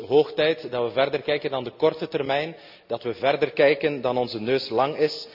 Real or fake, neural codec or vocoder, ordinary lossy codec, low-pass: real; none; none; 5.4 kHz